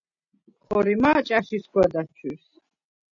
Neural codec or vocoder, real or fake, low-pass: none; real; 7.2 kHz